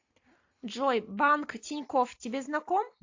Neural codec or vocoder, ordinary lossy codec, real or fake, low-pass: none; AAC, 48 kbps; real; 7.2 kHz